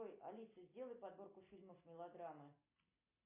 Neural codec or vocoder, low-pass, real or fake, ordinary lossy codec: none; 3.6 kHz; real; MP3, 24 kbps